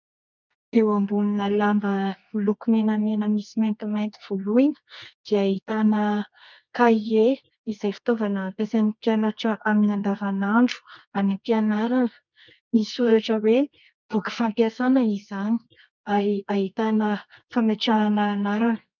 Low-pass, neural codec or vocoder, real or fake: 7.2 kHz; codec, 24 kHz, 0.9 kbps, WavTokenizer, medium music audio release; fake